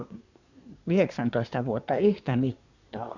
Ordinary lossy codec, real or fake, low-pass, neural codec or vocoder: none; fake; 7.2 kHz; codec, 24 kHz, 1 kbps, SNAC